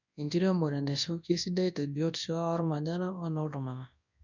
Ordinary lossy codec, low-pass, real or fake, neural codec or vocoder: none; 7.2 kHz; fake; codec, 24 kHz, 0.9 kbps, WavTokenizer, large speech release